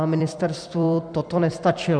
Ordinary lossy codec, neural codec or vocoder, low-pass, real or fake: MP3, 96 kbps; vocoder, 44.1 kHz, 128 mel bands every 256 samples, BigVGAN v2; 9.9 kHz; fake